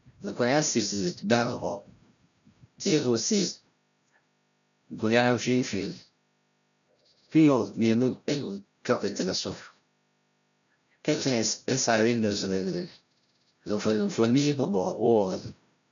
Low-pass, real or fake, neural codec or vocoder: 7.2 kHz; fake; codec, 16 kHz, 0.5 kbps, FreqCodec, larger model